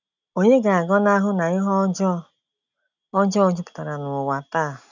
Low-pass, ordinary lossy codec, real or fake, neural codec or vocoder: 7.2 kHz; none; real; none